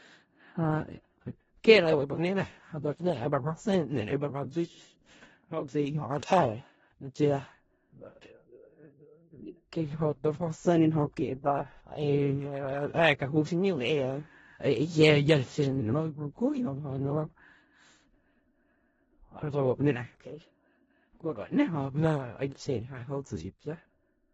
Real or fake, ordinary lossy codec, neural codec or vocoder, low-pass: fake; AAC, 24 kbps; codec, 16 kHz in and 24 kHz out, 0.4 kbps, LongCat-Audio-Codec, four codebook decoder; 10.8 kHz